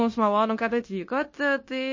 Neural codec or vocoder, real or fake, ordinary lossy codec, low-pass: codec, 16 kHz, 0.9 kbps, LongCat-Audio-Codec; fake; MP3, 32 kbps; 7.2 kHz